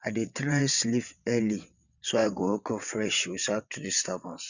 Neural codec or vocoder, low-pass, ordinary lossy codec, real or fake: vocoder, 22.05 kHz, 80 mel bands, WaveNeXt; 7.2 kHz; none; fake